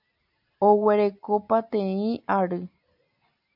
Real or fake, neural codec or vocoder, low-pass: real; none; 5.4 kHz